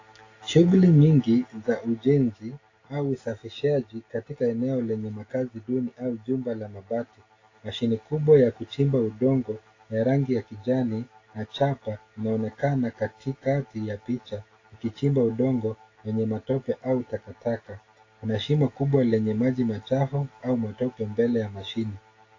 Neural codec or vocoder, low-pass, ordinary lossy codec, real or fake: none; 7.2 kHz; AAC, 32 kbps; real